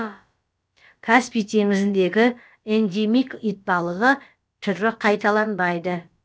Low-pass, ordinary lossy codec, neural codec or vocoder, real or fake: none; none; codec, 16 kHz, about 1 kbps, DyCAST, with the encoder's durations; fake